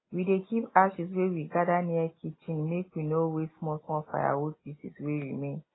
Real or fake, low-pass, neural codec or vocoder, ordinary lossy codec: real; 7.2 kHz; none; AAC, 16 kbps